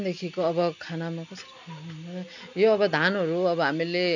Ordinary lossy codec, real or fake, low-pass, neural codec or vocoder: none; real; 7.2 kHz; none